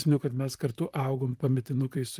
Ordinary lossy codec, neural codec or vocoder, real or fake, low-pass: Opus, 32 kbps; vocoder, 44.1 kHz, 128 mel bands, Pupu-Vocoder; fake; 14.4 kHz